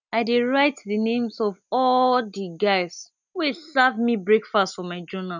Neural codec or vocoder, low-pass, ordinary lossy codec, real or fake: none; 7.2 kHz; none; real